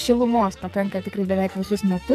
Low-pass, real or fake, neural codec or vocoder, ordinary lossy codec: 14.4 kHz; fake; codec, 44.1 kHz, 2.6 kbps, SNAC; AAC, 96 kbps